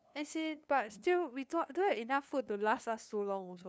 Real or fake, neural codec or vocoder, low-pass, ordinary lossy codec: fake; codec, 16 kHz, 2 kbps, FunCodec, trained on LibriTTS, 25 frames a second; none; none